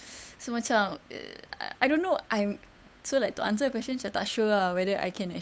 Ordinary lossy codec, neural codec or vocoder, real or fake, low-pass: none; codec, 16 kHz, 8 kbps, FunCodec, trained on Chinese and English, 25 frames a second; fake; none